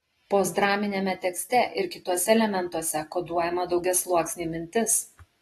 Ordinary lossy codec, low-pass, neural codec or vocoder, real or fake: AAC, 32 kbps; 19.8 kHz; none; real